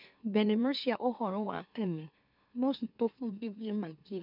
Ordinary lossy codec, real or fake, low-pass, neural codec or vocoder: none; fake; 5.4 kHz; autoencoder, 44.1 kHz, a latent of 192 numbers a frame, MeloTTS